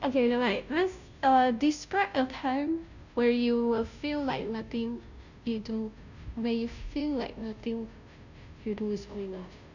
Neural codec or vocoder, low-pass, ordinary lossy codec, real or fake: codec, 16 kHz, 0.5 kbps, FunCodec, trained on Chinese and English, 25 frames a second; 7.2 kHz; none; fake